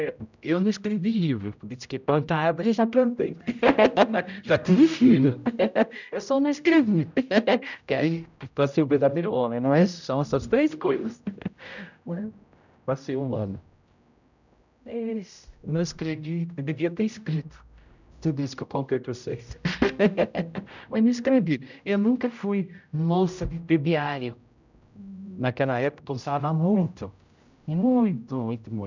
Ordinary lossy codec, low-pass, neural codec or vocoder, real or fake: none; 7.2 kHz; codec, 16 kHz, 0.5 kbps, X-Codec, HuBERT features, trained on general audio; fake